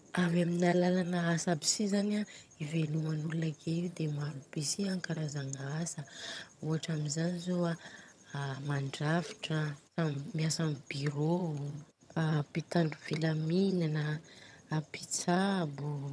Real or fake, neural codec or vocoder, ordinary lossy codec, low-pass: fake; vocoder, 22.05 kHz, 80 mel bands, HiFi-GAN; none; none